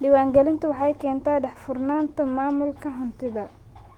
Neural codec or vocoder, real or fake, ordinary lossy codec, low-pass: autoencoder, 48 kHz, 128 numbers a frame, DAC-VAE, trained on Japanese speech; fake; Opus, 24 kbps; 19.8 kHz